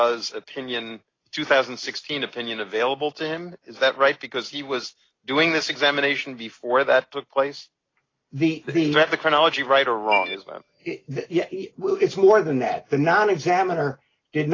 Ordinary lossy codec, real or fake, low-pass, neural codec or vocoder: AAC, 32 kbps; real; 7.2 kHz; none